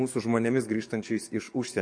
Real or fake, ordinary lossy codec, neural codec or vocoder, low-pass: fake; MP3, 48 kbps; codec, 44.1 kHz, 7.8 kbps, DAC; 9.9 kHz